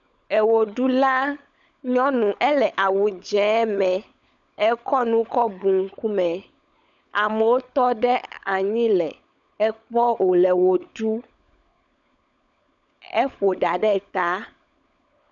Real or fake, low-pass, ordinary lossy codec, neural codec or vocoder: fake; 7.2 kHz; MP3, 96 kbps; codec, 16 kHz, 16 kbps, FunCodec, trained on LibriTTS, 50 frames a second